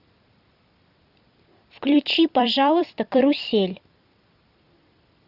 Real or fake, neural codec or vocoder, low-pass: fake; vocoder, 44.1 kHz, 128 mel bands every 256 samples, BigVGAN v2; 5.4 kHz